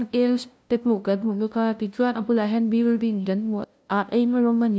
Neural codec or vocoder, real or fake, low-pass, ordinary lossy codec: codec, 16 kHz, 0.5 kbps, FunCodec, trained on LibriTTS, 25 frames a second; fake; none; none